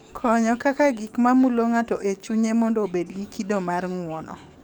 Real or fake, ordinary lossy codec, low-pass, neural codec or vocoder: fake; none; 19.8 kHz; codec, 44.1 kHz, 7.8 kbps, DAC